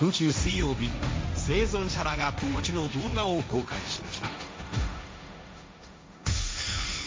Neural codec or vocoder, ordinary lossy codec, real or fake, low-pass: codec, 16 kHz, 1.1 kbps, Voila-Tokenizer; none; fake; none